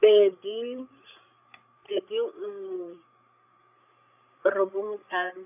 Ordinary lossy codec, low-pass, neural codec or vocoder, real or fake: none; 3.6 kHz; codec, 16 kHz, 16 kbps, FreqCodec, larger model; fake